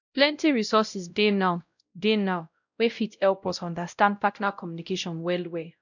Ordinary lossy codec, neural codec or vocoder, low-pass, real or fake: none; codec, 16 kHz, 0.5 kbps, X-Codec, WavLM features, trained on Multilingual LibriSpeech; 7.2 kHz; fake